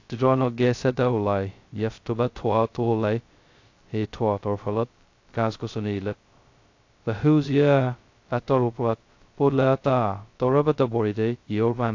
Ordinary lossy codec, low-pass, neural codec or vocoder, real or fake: AAC, 48 kbps; 7.2 kHz; codec, 16 kHz, 0.2 kbps, FocalCodec; fake